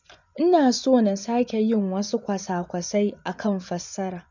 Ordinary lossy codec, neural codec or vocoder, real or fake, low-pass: none; vocoder, 24 kHz, 100 mel bands, Vocos; fake; 7.2 kHz